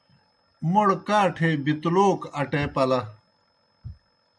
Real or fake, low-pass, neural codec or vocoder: real; 9.9 kHz; none